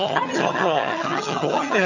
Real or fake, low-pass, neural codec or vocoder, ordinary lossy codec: fake; 7.2 kHz; vocoder, 22.05 kHz, 80 mel bands, HiFi-GAN; none